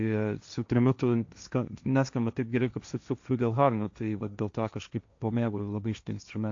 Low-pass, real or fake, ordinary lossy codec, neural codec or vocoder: 7.2 kHz; fake; AAC, 64 kbps; codec, 16 kHz, 1.1 kbps, Voila-Tokenizer